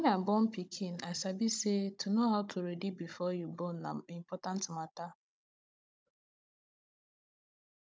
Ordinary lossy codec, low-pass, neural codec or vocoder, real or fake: none; none; codec, 16 kHz, 16 kbps, FunCodec, trained on Chinese and English, 50 frames a second; fake